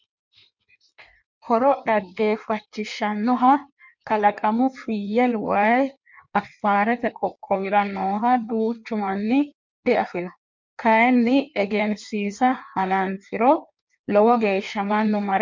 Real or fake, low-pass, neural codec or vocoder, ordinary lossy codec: fake; 7.2 kHz; codec, 16 kHz in and 24 kHz out, 1.1 kbps, FireRedTTS-2 codec; MP3, 64 kbps